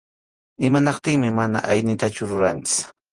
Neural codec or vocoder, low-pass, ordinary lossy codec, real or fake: vocoder, 48 kHz, 128 mel bands, Vocos; 10.8 kHz; Opus, 24 kbps; fake